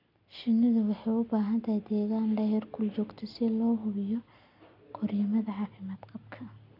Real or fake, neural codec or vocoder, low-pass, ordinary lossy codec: real; none; 5.4 kHz; none